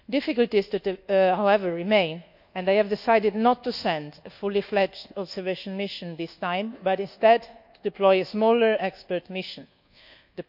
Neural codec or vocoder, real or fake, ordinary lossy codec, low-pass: codec, 24 kHz, 1.2 kbps, DualCodec; fake; none; 5.4 kHz